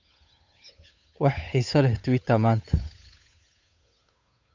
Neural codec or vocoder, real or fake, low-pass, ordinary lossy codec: codec, 16 kHz, 8 kbps, FunCodec, trained on Chinese and English, 25 frames a second; fake; 7.2 kHz; MP3, 64 kbps